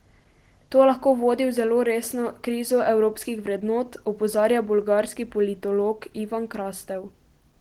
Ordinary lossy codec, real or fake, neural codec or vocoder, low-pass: Opus, 16 kbps; real; none; 19.8 kHz